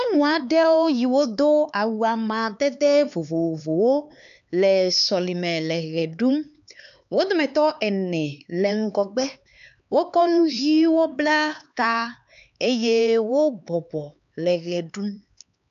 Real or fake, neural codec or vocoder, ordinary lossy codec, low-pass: fake; codec, 16 kHz, 4 kbps, X-Codec, HuBERT features, trained on LibriSpeech; MP3, 96 kbps; 7.2 kHz